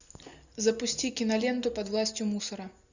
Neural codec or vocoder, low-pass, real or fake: none; 7.2 kHz; real